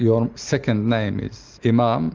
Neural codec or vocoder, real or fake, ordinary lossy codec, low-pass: none; real; Opus, 32 kbps; 7.2 kHz